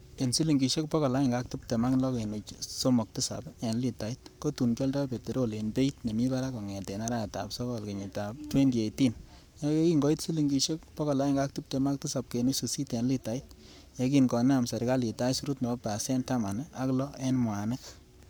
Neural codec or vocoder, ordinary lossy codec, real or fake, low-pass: codec, 44.1 kHz, 7.8 kbps, Pupu-Codec; none; fake; none